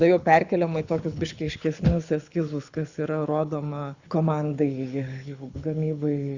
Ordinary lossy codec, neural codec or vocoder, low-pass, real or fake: Opus, 64 kbps; codec, 24 kHz, 6 kbps, HILCodec; 7.2 kHz; fake